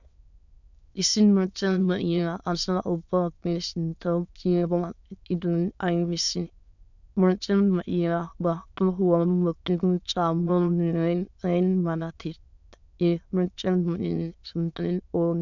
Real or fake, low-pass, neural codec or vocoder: fake; 7.2 kHz; autoencoder, 22.05 kHz, a latent of 192 numbers a frame, VITS, trained on many speakers